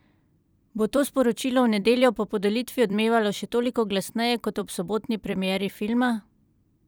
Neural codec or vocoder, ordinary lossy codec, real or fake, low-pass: vocoder, 44.1 kHz, 128 mel bands every 256 samples, BigVGAN v2; none; fake; none